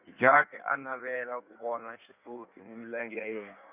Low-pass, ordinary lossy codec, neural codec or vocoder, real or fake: 3.6 kHz; none; codec, 16 kHz in and 24 kHz out, 1.1 kbps, FireRedTTS-2 codec; fake